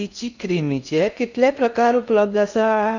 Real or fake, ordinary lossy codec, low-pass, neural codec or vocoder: fake; none; 7.2 kHz; codec, 16 kHz in and 24 kHz out, 0.6 kbps, FocalCodec, streaming, 4096 codes